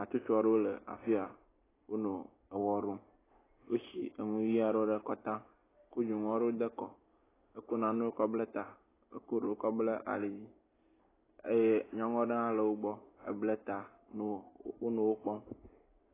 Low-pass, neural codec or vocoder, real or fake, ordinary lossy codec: 3.6 kHz; none; real; AAC, 16 kbps